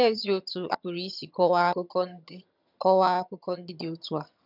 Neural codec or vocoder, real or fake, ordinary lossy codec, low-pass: vocoder, 22.05 kHz, 80 mel bands, HiFi-GAN; fake; none; 5.4 kHz